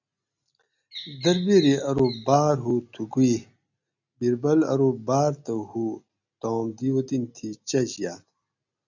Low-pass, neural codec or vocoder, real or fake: 7.2 kHz; none; real